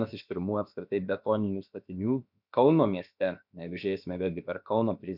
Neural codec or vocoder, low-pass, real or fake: codec, 16 kHz, about 1 kbps, DyCAST, with the encoder's durations; 5.4 kHz; fake